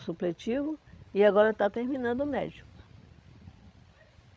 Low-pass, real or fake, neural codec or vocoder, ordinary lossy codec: none; fake; codec, 16 kHz, 16 kbps, FreqCodec, larger model; none